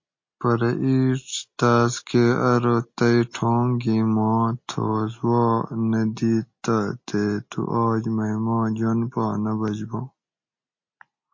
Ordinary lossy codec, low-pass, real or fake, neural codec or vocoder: MP3, 32 kbps; 7.2 kHz; real; none